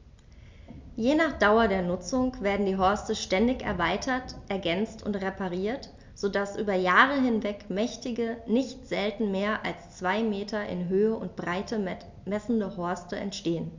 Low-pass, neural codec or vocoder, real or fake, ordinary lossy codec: 7.2 kHz; none; real; MP3, 64 kbps